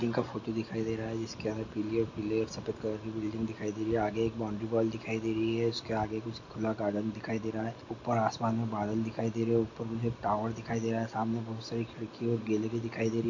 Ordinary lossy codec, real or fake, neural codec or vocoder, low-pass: AAC, 48 kbps; real; none; 7.2 kHz